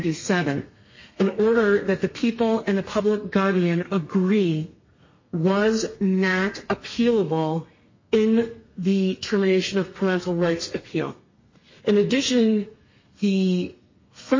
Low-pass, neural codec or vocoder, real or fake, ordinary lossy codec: 7.2 kHz; codec, 32 kHz, 1.9 kbps, SNAC; fake; MP3, 32 kbps